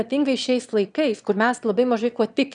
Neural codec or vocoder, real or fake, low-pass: autoencoder, 22.05 kHz, a latent of 192 numbers a frame, VITS, trained on one speaker; fake; 9.9 kHz